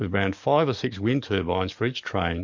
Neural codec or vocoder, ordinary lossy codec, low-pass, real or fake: codec, 44.1 kHz, 7.8 kbps, DAC; MP3, 48 kbps; 7.2 kHz; fake